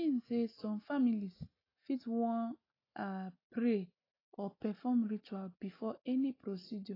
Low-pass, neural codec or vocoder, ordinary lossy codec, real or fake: 5.4 kHz; none; AAC, 24 kbps; real